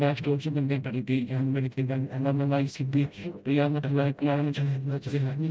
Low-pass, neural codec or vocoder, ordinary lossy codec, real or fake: none; codec, 16 kHz, 0.5 kbps, FreqCodec, smaller model; none; fake